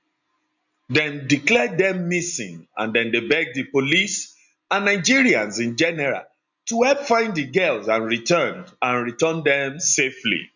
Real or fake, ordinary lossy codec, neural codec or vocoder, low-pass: real; none; none; 7.2 kHz